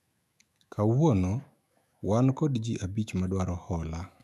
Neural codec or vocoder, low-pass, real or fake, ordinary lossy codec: autoencoder, 48 kHz, 128 numbers a frame, DAC-VAE, trained on Japanese speech; 14.4 kHz; fake; none